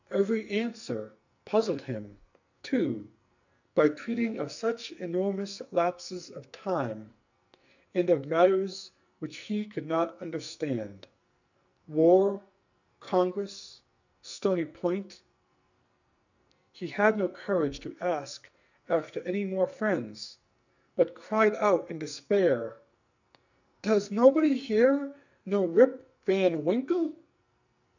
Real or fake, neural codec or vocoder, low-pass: fake; codec, 44.1 kHz, 2.6 kbps, SNAC; 7.2 kHz